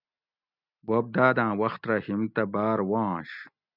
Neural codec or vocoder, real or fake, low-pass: none; real; 5.4 kHz